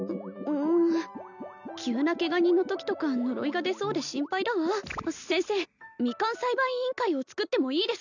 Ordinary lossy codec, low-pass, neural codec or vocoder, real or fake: none; 7.2 kHz; none; real